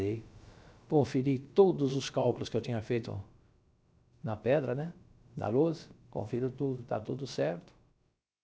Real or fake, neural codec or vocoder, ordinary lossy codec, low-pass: fake; codec, 16 kHz, about 1 kbps, DyCAST, with the encoder's durations; none; none